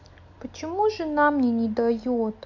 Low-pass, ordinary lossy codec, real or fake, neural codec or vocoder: 7.2 kHz; AAC, 48 kbps; real; none